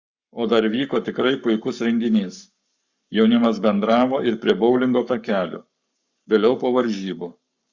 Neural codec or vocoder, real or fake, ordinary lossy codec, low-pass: codec, 44.1 kHz, 7.8 kbps, Pupu-Codec; fake; Opus, 64 kbps; 7.2 kHz